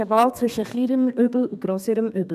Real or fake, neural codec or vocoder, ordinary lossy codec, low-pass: fake; codec, 32 kHz, 1.9 kbps, SNAC; none; 14.4 kHz